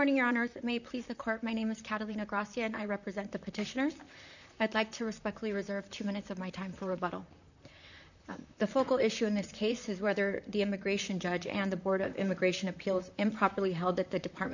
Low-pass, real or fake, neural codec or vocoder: 7.2 kHz; fake; vocoder, 44.1 kHz, 128 mel bands, Pupu-Vocoder